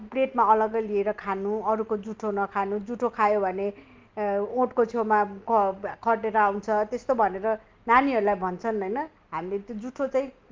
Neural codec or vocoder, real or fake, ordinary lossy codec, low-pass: none; real; Opus, 24 kbps; 7.2 kHz